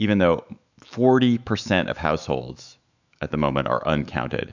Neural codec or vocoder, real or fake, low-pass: autoencoder, 48 kHz, 128 numbers a frame, DAC-VAE, trained on Japanese speech; fake; 7.2 kHz